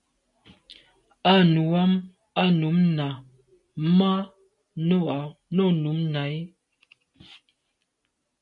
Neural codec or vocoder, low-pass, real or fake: none; 10.8 kHz; real